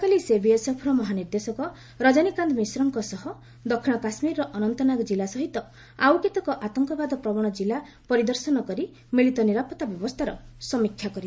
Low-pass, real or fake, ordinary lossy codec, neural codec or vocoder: none; real; none; none